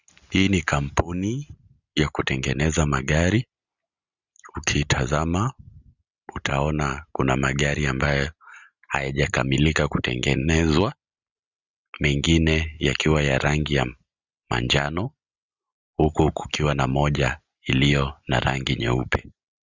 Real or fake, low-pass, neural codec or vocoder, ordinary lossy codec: real; 7.2 kHz; none; Opus, 64 kbps